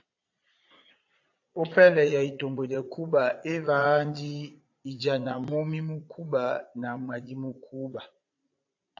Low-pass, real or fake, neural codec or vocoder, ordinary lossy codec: 7.2 kHz; fake; vocoder, 22.05 kHz, 80 mel bands, Vocos; AAC, 48 kbps